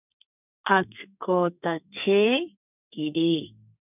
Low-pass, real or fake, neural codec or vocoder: 3.6 kHz; fake; codec, 32 kHz, 1.9 kbps, SNAC